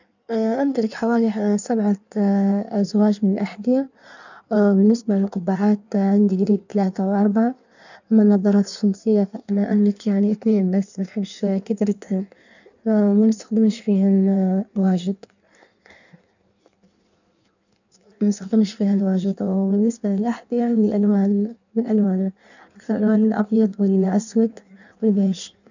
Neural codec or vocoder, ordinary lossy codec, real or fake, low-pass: codec, 16 kHz in and 24 kHz out, 1.1 kbps, FireRedTTS-2 codec; none; fake; 7.2 kHz